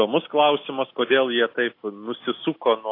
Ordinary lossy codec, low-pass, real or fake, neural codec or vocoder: AAC, 32 kbps; 5.4 kHz; real; none